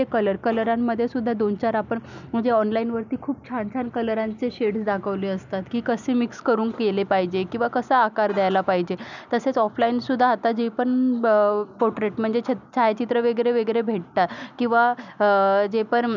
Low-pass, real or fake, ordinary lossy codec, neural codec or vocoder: 7.2 kHz; real; none; none